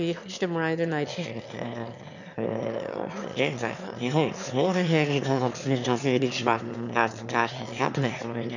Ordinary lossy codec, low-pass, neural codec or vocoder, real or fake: none; 7.2 kHz; autoencoder, 22.05 kHz, a latent of 192 numbers a frame, VITS, trained on one speaker; fake